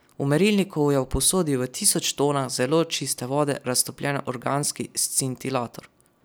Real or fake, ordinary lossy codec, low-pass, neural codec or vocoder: real; none; none; none